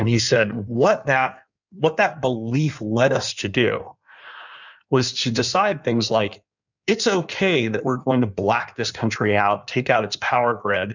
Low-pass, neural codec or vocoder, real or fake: 7.2 kHz; codec, 16 kHz in and 24 kHz out, 1.1 kbps, FireRedTTS-2 codec; fake